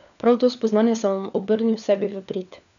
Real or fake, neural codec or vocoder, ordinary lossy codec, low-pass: fake; codec, 16 kHz, 4 kbps, FunCodec, trained on LibriTTS, 50 frames a second; none; 7.2 kHz